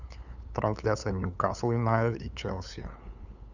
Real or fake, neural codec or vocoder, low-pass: fake; codec, 16 kHz, 8 kbps, FunCodec, trained on LibriTTS, 25 frames a second; 7.2 kHz